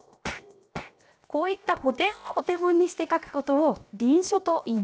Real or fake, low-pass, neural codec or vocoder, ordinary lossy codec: fake; none; codec, 16 kHz, 0.7 kbps, FocalCodec; none